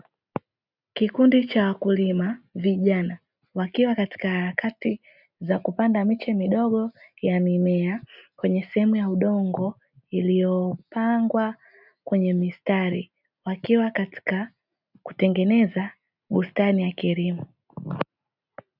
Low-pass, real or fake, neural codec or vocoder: 5.4 kHz; real; none